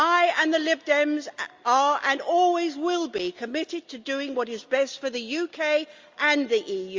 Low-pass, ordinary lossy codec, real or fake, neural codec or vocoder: 7.2 kHz; Opus, 32 kbps; real; none